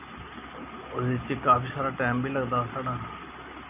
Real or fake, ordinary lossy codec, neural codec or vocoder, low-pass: real; none; none; 3.6 kHz